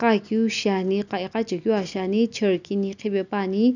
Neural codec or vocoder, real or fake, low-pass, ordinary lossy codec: none; real; 7.2 kHz; none